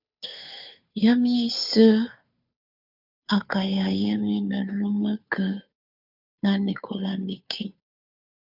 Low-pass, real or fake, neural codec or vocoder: 5.4 kHz; fake; codec, 16 kHz, 2 kbps, FunCodec, trained on Chinese and English, 25 frames a second